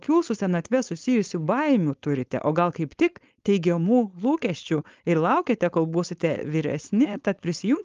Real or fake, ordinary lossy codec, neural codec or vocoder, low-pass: fake; Opus, 32 kbps; codec, 16 kHz, 4.8 kbps, FACodec; 7.2 kHz